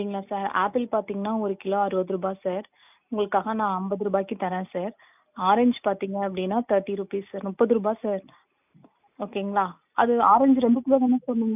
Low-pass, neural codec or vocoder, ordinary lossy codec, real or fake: 3.6 kHz; none; none; real